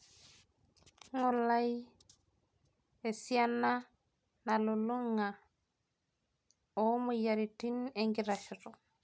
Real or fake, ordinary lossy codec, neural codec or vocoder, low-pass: real; none; none; none